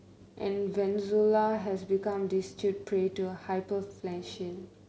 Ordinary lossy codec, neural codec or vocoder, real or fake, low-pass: none; none; real; none